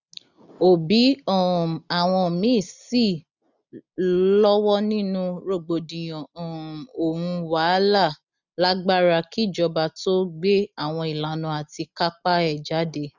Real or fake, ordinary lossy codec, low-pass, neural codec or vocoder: real; none; 7.2 kHz; none